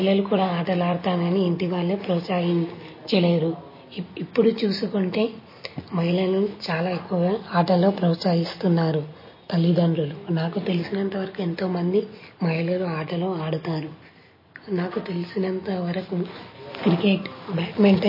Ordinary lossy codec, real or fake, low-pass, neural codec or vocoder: MP3, 24 kbps; real; 5.4 kHz; none